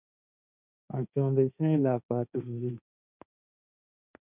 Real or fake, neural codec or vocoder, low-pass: fake; codec, 16 kHz, 1.1 kbps, Voila-Tokenizer; 3.6 kHz